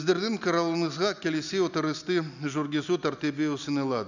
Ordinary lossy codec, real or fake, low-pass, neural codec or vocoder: none; real; 7.2 kHz; none